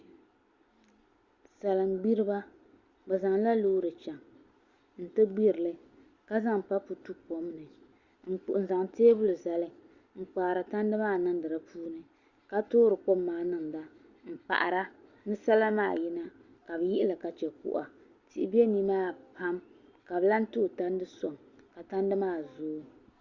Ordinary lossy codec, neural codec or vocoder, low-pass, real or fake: Opus, 64 kbps; none; 7.2 kHz; real